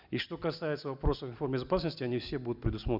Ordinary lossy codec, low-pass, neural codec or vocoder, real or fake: none; 5.4 kHz; none; real